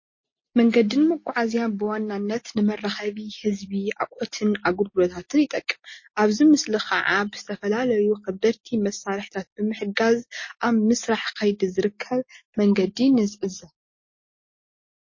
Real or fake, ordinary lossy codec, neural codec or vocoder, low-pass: real; MP3, 32 kbps; none; 7.2 kHz